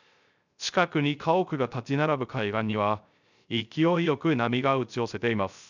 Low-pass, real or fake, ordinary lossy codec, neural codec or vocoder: 7.2 kHz; fake; none; codec, 16 kHz, 0.3 kbps, FocalCodec